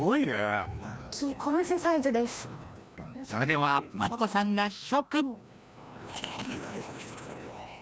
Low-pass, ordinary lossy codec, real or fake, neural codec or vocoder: none; none; fake; codec, 16 kHz, 1 kbps, FreqCodec, larger model